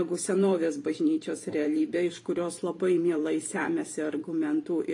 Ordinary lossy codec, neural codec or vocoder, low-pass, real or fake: AAC, 48 kbps; vocoder, 44.1 kHz, 128 mel bands every 512 samples, BigVGAN v2; 10.8 kHz; fake